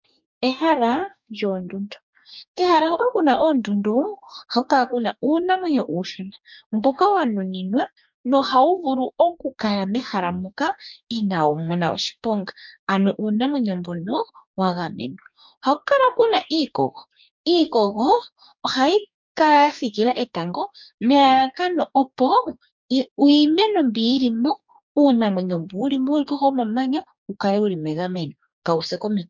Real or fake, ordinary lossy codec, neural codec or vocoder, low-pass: fake; MP3, 64 kbps; codec, 44.1 kHz, 2.6 kbps, DAC; 7.2 kHz